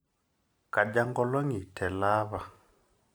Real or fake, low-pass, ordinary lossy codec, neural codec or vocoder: real; none; none; none